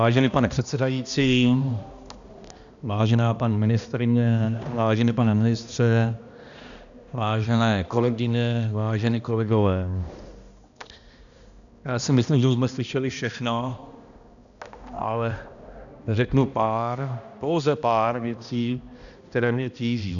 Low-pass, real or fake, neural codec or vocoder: 7.2 kHz; fake; codec, 16 kHz, 1 kbps, X-Codec, HuBERT features, trained on balanced general audio